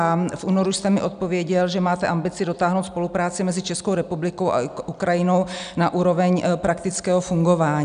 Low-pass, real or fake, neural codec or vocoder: 9.9 kHz; real; none